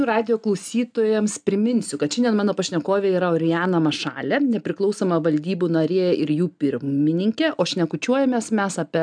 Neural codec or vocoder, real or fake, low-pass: none; real; 9.9 kHz